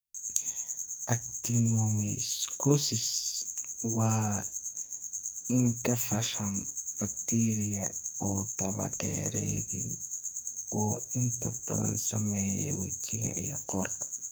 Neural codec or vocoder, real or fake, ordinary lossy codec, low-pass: codec, 44.1 kHz, 2.6 kbps, SNAC; fake; none; none